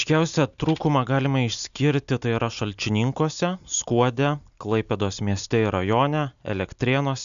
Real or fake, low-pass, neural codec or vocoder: real; 7.2 kHz; none